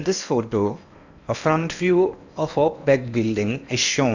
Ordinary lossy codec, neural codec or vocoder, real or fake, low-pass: none; codec, 16 kHz in and 24 kHz out, 0.8 kbps, FocalCodec, streaming, 65536 codes; fake; 7.2 kHz